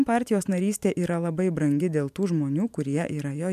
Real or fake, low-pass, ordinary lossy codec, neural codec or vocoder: fake; 14.4 kHz; MP3, 96 kbps; vocoder, 44.1 kHz, 128 mel bands every 512 samples, BigVGAN v2